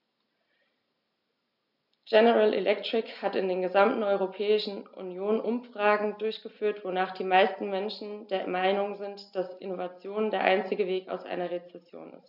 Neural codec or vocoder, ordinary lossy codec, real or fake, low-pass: none; none; real; 5.4 kHz